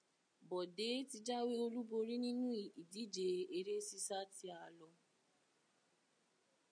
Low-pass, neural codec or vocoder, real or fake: 9.9 kHz; none; real